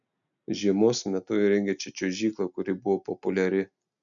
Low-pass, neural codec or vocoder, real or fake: 7.2 kHz; none; real